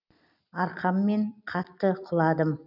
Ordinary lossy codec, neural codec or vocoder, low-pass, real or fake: none; none; 5.4 kHz; real